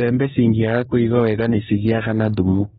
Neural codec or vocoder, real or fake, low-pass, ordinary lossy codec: codec, 44.1 kHz, 2.6 kbps, DAC; fake; 19.8 kHz; AAC, 16 kbps